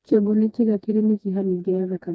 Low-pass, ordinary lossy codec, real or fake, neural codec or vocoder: none; none; fake; codec, 16 kHz, 2 kbps, FreqCodec, smaller model